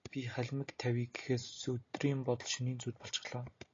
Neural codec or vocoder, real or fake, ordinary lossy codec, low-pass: none; real; AAC, 32 kbps; 7.2 kHz